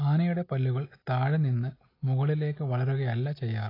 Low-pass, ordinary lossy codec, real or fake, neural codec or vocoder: 5.4 kHz; AAC, 24 kbps; real; none